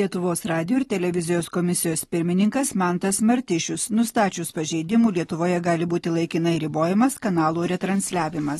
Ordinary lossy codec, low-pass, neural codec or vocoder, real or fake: AAC, 32 kbps; 14.4 kHz; none; real